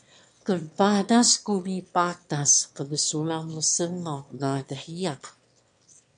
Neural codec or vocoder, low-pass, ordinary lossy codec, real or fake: autoencoder, 22.05 kHz, a latent of 192 numbers a frame, VITS, trained on one speaker; 9.9 kHz; MP3, 64 kbps; fake